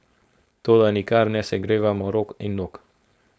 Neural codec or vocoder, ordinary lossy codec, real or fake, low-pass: codec, 16 kHz, 4.8 kbps, FACodec; none; fake; none